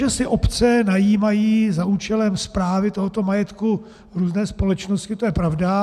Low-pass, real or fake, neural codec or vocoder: 14.4 kHz; real; none